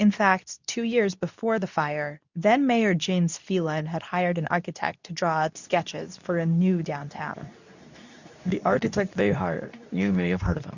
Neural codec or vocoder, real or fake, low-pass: codec, 24 kHz, 0.9 kbps, WavTokenizer, medium speech release version 2; fake; 7.2 kHz